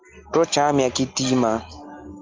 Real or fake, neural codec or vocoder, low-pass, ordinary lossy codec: real; none; 7.2 kHz; Opus, 24 kbps